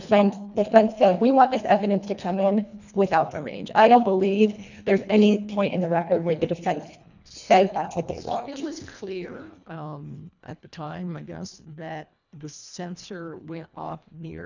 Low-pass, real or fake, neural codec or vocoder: 7.2 kHz; fake; codec, 24 kHz, 1.5 kbps, HILCodec